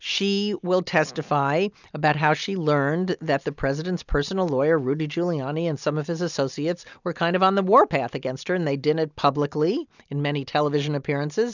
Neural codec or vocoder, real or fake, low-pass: none; real; 7.2 kHz